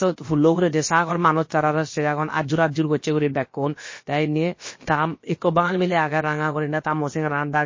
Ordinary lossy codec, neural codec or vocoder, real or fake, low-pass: MP3, 32 kbps; codec, 16 kHz, about 1 kbps, DyCAST, with the encoder's durations; fake; 7.2 kHz